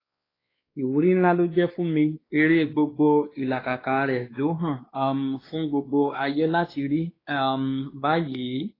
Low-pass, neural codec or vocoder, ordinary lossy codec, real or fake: 5.4 kHz; codec, 16 kHz, 2 kbps, X-Codec, WavLM features, trained on Multilingual LibriSpeech; AAC, 24 kbps; fake